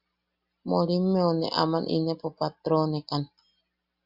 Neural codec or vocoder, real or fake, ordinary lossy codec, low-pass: none; real; Opus, 64 kbps; 5.4 kHz